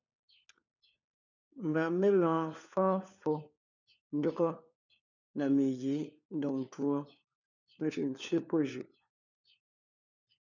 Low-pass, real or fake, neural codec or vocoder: 7.2 kHz; fake; codec, 16 kHz, 4 kbps, FunCodec, trained on LibriTTS, 50 frames a second